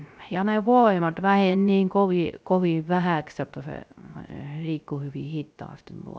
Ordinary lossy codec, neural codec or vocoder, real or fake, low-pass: none; codec, 16 kHz, 0.3 kbps, FocalCodec; fake; none